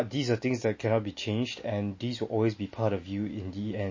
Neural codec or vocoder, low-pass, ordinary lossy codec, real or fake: none; 7.2 kHz; MP3, 32 kbps; real